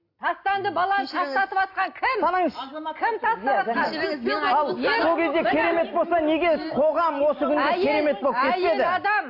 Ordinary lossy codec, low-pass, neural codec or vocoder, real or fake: AAC, 32 kbps; 5.4 kHz; none; real